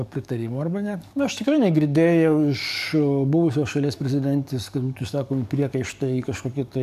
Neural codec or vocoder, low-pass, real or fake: codec, 44.1 kHz, 7.8 kbps, DAC; 14.4 kHz; fake